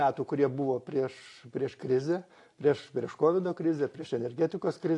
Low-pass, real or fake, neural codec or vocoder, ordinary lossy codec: 10.8 kHz; real; none; AAC, 48 kbps